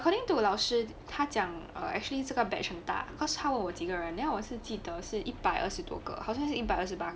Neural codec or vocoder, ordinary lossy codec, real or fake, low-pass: none; none; real; none